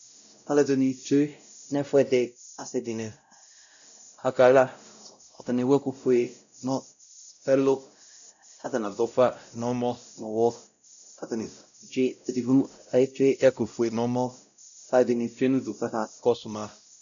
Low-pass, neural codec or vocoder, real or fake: 7.2 kHz; codec, 16 kHz, 0.5 kbps, X-Codec, WavLM features, trained on Multilingual LibriSpeech; fake